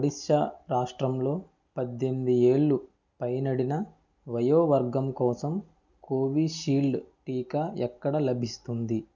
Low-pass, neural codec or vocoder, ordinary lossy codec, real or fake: 7.2 kHz; none; none; real